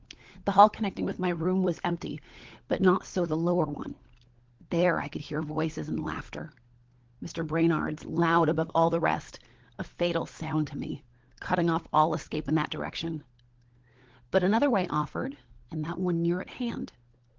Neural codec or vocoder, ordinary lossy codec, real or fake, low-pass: codec, 16 kHz, 16 kbps, FunCodec, trained on LibriTTS, 50 frames a second; Opus, 16 kbps; fake; 7.2 kHz